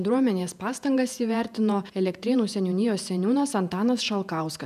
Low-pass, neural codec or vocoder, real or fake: 14.4 kHz; vocoder, 48 kHz, 128 mel bands, Vocos; fake